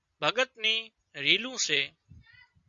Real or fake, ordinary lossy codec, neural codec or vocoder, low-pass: real; Opus, 64 kbps; none; 7.2 kHz